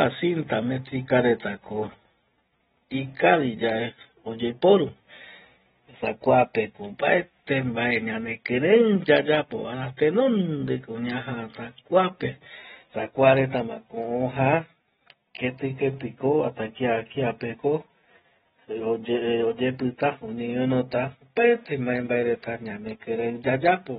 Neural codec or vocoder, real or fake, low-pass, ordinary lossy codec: none; real; 7.2 kHz; AAC, 16 kbps